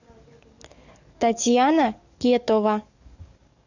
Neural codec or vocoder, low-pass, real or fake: codec, 44.1 kHz, 7.8 kbps, DAC; 7.2 kHz; fake